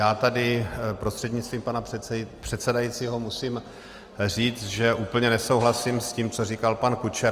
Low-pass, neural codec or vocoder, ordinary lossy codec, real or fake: 14.4 kHz; none; Opus, 32 kbps; real